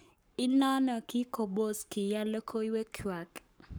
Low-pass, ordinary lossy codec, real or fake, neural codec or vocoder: none; none; fake; codec, 44.1 kHz, 7.8 kbps, Pupu-Codec